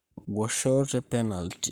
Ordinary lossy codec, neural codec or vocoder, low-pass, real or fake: none; codec, 44.1 kHz, 7.8 kbps, Pupu-Codec; none; fake